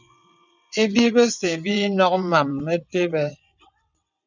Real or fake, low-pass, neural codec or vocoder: fake; 7.2 kHz; vocoder, 22.05 kHz, 80 mel bands, WaveNeXt